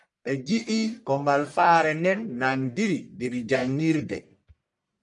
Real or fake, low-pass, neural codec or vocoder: fake; 10.8 kHz; codec, 44.1 kHz, 1.7 kbps, Pupu-Codec